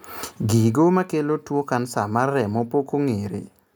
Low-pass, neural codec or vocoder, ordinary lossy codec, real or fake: none; none; none; real